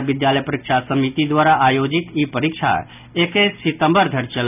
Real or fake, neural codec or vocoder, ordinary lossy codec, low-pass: real; none; none; 3.6 kHz